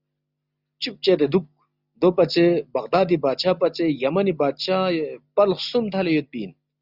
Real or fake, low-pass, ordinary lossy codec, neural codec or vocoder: real; 5.4 kHz; Opus, 64 kbps; none